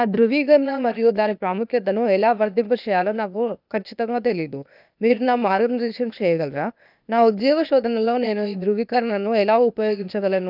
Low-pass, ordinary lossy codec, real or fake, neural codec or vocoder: 5.4 kHz; none; fake; codec, 16 kHz, 0.8 kbps, ZipCodec